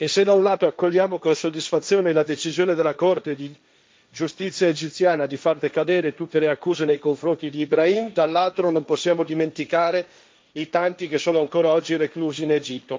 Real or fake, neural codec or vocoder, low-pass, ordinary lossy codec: fake; codec, 16 kHz, 1.1 kbps, Voila-Tokenizer; none; none